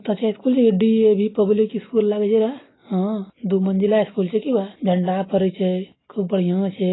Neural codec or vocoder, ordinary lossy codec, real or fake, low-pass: none; AAC, 16 kbps; real; 7.2 kHz